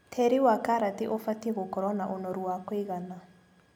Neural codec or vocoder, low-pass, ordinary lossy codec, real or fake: none; none; none; real